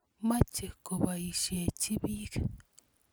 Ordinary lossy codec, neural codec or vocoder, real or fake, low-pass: none; none; real; none